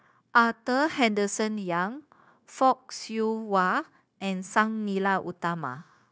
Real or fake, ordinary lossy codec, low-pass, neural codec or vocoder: fake; none; none; codec, 16 kHz, 0.9 kbps, LongCat-Audio-Codec